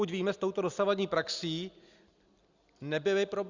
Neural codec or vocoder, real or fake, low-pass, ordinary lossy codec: none; real; 7.2 kHz; Opus, 64 kbps